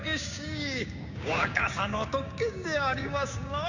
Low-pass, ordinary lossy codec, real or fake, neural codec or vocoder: 7.2 kHz; none; fake; codec, 16 kHz, 6 kbps, DAC